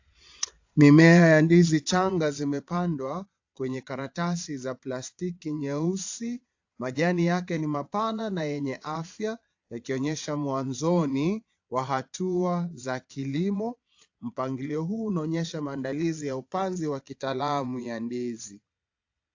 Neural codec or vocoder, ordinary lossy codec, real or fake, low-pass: vocoder, 22.05 kHz, 80 mel bands, Vocos; AAC, 48 kbps; fake; 7.2 kHz